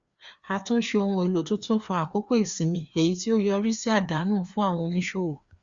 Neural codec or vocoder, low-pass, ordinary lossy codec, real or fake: codec, 16 kHz, 2 kbps, FreqCodec, larger model; 7.2 kHz; Opus, 64 kbps; fake